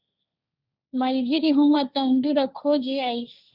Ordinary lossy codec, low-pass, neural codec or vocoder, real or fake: Opus, 64 kbps; 5.4 kHz; codec, 16 kHz, 1.1 kbps, Voila-Tokenizer; fake